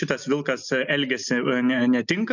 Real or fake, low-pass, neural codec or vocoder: real; 7.2 kHz; none